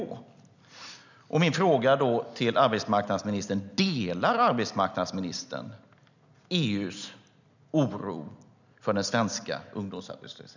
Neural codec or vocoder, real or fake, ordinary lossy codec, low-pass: none; real; none; 7.2 kHz